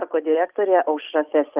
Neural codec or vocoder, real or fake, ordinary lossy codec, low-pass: none; real; Opus, 24 kbps; 3.6 kHz